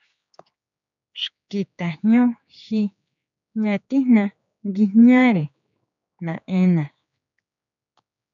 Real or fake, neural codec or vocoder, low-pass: fake; codec, 16 kHz, 4 kbps, X-Codec, HuBERT features, trained on general audio; 7.2 kHz